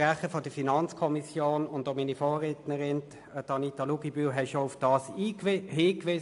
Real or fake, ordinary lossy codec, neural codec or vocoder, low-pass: real; AAC, 64 kbps; none; 10.8 kHz